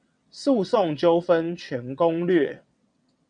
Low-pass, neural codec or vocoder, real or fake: 9.9 kHz; vocoder, 22.05 kHz, 80 mel bands, WaveNeXt; fake